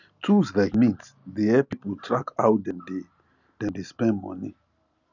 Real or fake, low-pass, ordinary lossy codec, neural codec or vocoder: real; 7.2 kHz; none; none